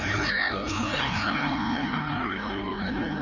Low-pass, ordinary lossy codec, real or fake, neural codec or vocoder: 7.2 kHz; none; fake; codec, 16 kHz, 1 kbps, FreqCodec, larger model